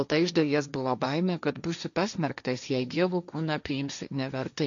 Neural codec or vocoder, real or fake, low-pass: codec, 16 kHz, 1.1 kbps, Voila-Tokenizer; fake; 7.2 kHz